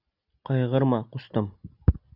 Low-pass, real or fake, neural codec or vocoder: 5.4 kHz; real; none